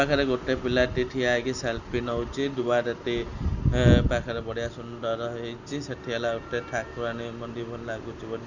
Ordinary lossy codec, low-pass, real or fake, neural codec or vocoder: none; 7.2 kHz; real; none